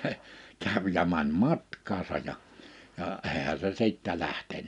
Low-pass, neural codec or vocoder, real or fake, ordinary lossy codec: 10.8 kHz; none; real; none